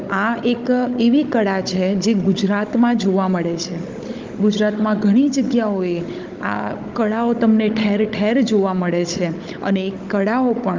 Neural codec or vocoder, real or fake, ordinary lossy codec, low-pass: codec, 16 kHz, 16 kbps, FunCodec, trained on Chinese and English, 50 frames a second; fake; Opus, 24 kbps; 7.2 kHz